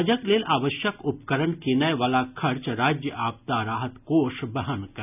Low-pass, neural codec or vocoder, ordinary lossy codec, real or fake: 3.6 kHz; none; none; real